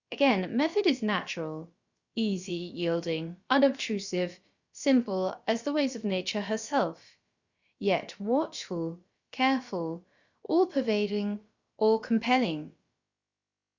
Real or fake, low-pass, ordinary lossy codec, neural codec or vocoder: fake; 7.2 kHz; Opus, 64 kbps; codec, 16 kHz, about 1 kbps, DyCAST, with the encoder's durations